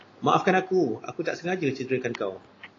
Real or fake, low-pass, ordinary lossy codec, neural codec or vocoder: real; 7.2 kHz; AAC, 32 kbps; none